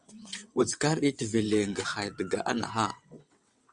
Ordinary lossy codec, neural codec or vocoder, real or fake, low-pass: Opus, 64 kbps; vocoder, 22.05 kHz, 80 mel bands, WaveNeXt; fake; 9.9 kHz